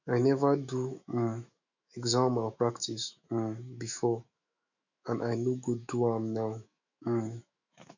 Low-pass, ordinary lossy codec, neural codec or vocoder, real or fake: 7.2 kHz; none; autoencoder, 48 kHz, 128 numbers a frame, DAC-VAE, trained on Japanese speech; fake